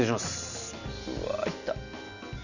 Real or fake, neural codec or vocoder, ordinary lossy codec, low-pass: real; none; none; 7.2 kHz